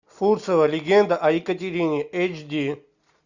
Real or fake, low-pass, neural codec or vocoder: real; 7.2 kHz; none